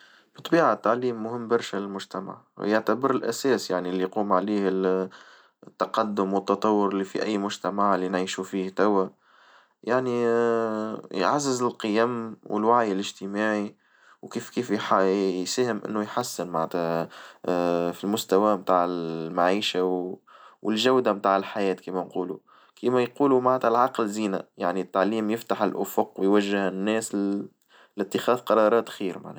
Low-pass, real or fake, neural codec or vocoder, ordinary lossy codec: none; real; none; none